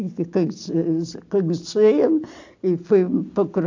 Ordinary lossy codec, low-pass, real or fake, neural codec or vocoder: AAC, 48 kbps; 7.2 kHz; real; none